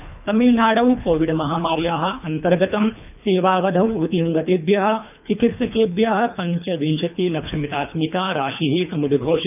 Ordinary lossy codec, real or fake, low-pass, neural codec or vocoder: none; fake; 3.6 kHz; codec, 24 kHz, 3 kbps, HILCodec